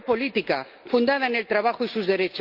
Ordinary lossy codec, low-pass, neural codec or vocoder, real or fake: Opus, 24 kbps; 5.4 kHz; none; real